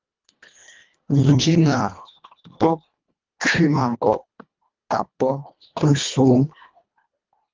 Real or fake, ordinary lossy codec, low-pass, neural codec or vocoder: fake; Opus, 24 kbps; 7.2 kHz; codec, 24 kHz, 1.5 kbps, HILCodec